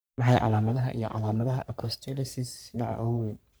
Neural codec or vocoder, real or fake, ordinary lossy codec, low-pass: codec, 44.1 kHz, 3.4 kbps, Pupu-Codec; fake; none; none